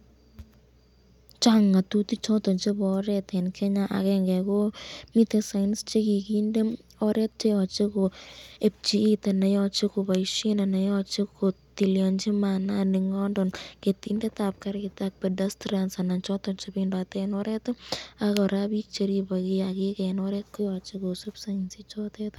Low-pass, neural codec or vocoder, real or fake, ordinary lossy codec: 19.8 kHz; none; real; none